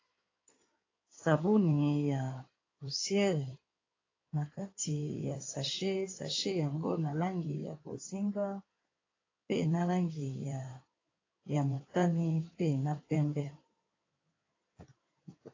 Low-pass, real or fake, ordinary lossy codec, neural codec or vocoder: 7.2 kHz; fake; AAC, 32 kbps; codec, 16 kHz in and 24 kHz out, 2.2 kbps, FireRedTTS-2 codec